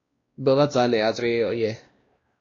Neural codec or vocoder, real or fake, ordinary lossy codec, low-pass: codec, 16 kHz, 1 kbps, X-Codec, WavLM features, trained on Multilingual LibriSpeech; fake; AAC, 32 kbps; 7.2 kHz